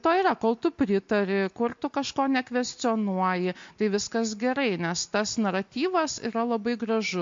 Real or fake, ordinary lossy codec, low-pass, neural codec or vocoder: real; MP3, 48 kbps; 7.2 kHz; none